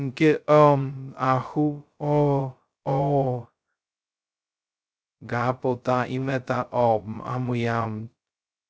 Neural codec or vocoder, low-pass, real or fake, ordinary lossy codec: codec, 16 kHz, 0.2 kbps, FocalCodec; none; fake; none